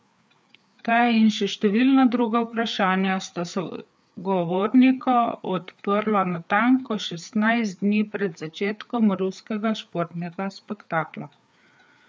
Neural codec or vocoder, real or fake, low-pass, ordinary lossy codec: codec, 16 kHz, 4 kbps, FreqCodec, larger model; fake; none; none